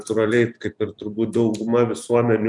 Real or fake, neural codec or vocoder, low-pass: real; none; 10.8 kHz